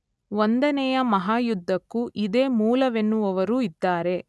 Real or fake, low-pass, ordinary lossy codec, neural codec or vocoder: real; none; none; none